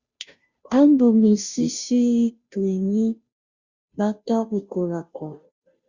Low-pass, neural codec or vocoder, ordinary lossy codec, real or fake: 7.2 kHz; codec, 16 kHz, 0.5 kbps, FunCodec, trained on Chinese and English, 25 frames a second; Opus, 64 kbps; fake